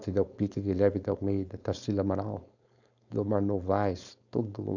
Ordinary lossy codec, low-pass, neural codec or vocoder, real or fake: none; 7.2 kHz; codec, 16 kHz, 4.8 kbps, FACodec; fake